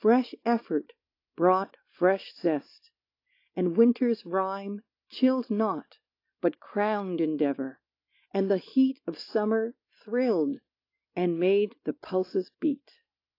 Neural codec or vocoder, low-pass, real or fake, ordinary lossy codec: none; 5.4 kHz; real; AAC, 32 kbps